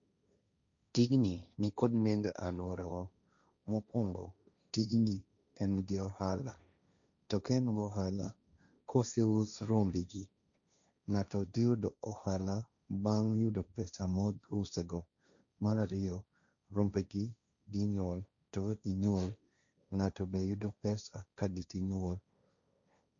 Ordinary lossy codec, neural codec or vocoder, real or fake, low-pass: none; codec, 16 kHz, 1.1 kbps, Voila-Tokenizer; fake; 7.2 kHz